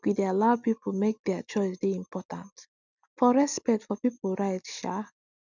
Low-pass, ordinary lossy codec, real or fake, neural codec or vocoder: 7.2 kHz; none; real; none